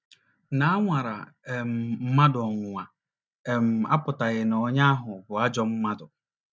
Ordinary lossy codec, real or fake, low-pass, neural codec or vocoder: none; real; none; none